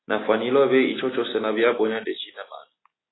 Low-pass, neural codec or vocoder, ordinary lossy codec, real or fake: 7.2 kHz; none; AAC, 16 kbps; real